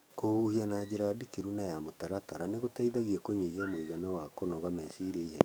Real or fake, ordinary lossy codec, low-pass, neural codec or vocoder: fake; none; none; codec, 44.1 kHz, 7.8 kbps, DAC